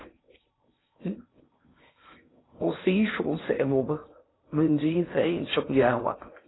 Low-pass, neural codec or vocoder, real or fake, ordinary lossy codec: 7.2 kHz; codec, 16 kHz in and 24 kHz out, 0.6 kbps, FocalCodec, streaming, 4096 codes; fake; AAC, 16 kbps